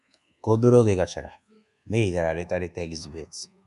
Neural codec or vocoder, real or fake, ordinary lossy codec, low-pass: codec, 24 kHz, 1.2 kbps, DualCodec; fake; none; 10.8 kHz